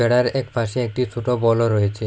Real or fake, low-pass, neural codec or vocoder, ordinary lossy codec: real; none; none; none